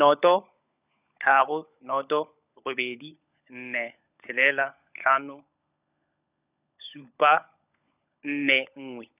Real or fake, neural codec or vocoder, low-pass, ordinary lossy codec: fake; codec, 16 kHz, 16 kbps, FunCodec, trained on LibriTTS, 50 frames a second; 3.6 kHz; none